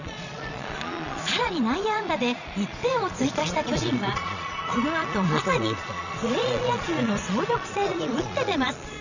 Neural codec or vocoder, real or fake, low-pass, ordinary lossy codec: vocoder, 22.05 kHz, 80 mel bands, Vocos; fake; 7.2 kHz; none